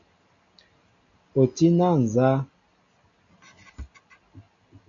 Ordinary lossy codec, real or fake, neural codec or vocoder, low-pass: MP3, 64 kbps; real; none; 7.2 kHz